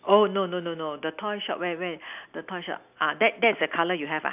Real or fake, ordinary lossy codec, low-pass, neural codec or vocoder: real; none; 3.6 kHz; none